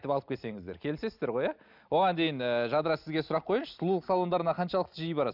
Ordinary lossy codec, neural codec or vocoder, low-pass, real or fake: none; none; 5.4 kHz; real